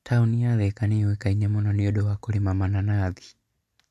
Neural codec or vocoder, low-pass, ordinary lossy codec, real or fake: none; 14.4 kHz; MP3, 64 kbps; real